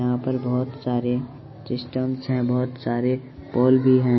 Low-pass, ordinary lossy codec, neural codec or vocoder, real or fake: 7.2 kHz; MP3, 24 kbps; none; real